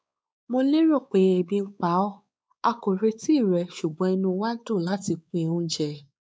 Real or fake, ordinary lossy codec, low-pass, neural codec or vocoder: fake; none; none; codec, 16 kHz, 4 kbps, X-Codec, WavLM features, trained on Multilingual LibriSpeech